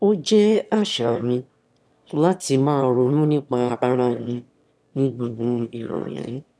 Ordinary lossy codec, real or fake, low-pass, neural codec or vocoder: none; fake; none; autoencoder, 22.05 kHz, a latent of 192 numbers a frame, VITS, trained on one speaker